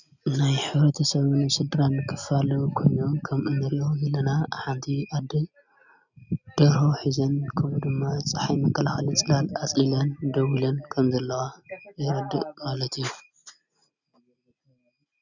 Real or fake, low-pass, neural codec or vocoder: real; 7.2 kHz; none